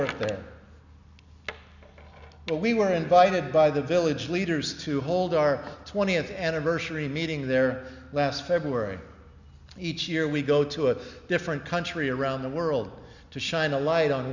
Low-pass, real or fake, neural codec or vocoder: 7.2 kHz; real; none